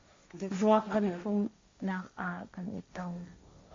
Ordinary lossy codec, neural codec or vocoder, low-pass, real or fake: MP3, 48 kbps; codec, 16 kHz, 1.1 kbps, Voila-Tokenizer; 7.2 kHz; fake